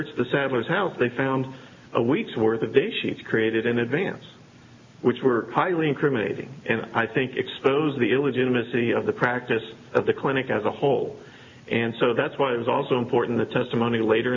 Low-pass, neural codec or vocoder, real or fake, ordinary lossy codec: 7.2 kHz; none; real; MP3, 64 kbps